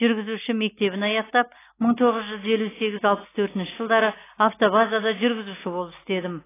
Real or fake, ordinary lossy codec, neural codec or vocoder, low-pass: real; AAC, 16 kbps; none; 3.6 kHz